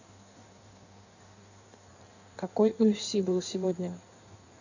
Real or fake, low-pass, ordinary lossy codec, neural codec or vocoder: fake; 7.2 kHz; none; codec, 16 kHz in and 24 kHz out, 1.1 kbps, FireRedTTS-2 codec